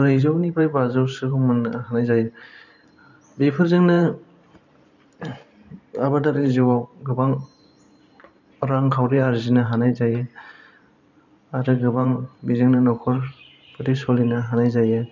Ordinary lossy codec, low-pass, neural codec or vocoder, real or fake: none; 7.2 kHz; vocoder, 44.1 kHz, 128 mel bands every 512 samples, BigVGAN v2; fake